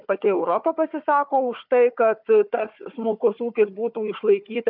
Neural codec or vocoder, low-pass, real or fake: codec, 16 kHz, 4 kbps, FunCodec, trained on Chinese and English, 50 frames a second; 5.4 kHz; fake